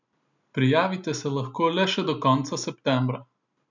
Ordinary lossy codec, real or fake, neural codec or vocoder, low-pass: none; real; none; 7.2 kHz